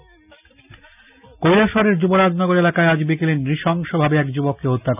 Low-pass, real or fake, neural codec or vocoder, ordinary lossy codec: 3.6 kHz; real; none; none